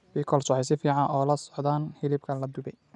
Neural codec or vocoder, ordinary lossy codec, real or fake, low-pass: none; none; real; 10.8 kHz